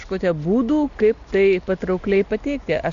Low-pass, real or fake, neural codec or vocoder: 7.2 kHz; real; none